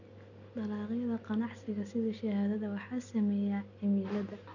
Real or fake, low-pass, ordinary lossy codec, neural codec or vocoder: real; 7.2 kHz; none; none